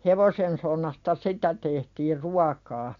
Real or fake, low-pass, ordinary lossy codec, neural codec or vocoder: real; 7.2 kHz; MP3, 48 kbps; none